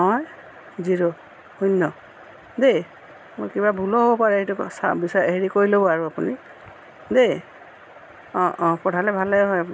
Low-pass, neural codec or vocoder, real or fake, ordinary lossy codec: none; none; real; none